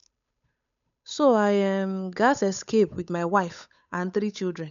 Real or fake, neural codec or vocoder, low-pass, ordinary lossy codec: fake; codec, 16 kHz, 8 kbps, FunCodec, trained on Chinese and English, 25 frames a second; 7.2 kHz; none